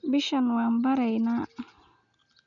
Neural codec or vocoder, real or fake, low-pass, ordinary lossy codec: none; real; 7.2 kHz; none